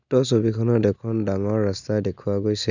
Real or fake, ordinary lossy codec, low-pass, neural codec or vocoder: real; none; 7.2 kHz; none